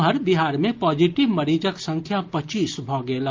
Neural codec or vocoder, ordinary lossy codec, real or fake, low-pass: none; Opus, 24 kbps; real; 7.2 kHz